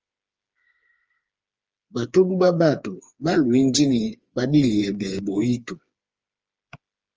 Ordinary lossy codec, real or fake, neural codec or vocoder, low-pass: Opus, 24 kbps; fake; codec, 16 kHz, 4 kbps, FreqCodec, smaller model; 7.2 kHz